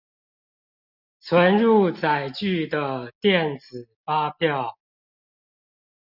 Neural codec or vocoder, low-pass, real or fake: none; 5.4 kHz; real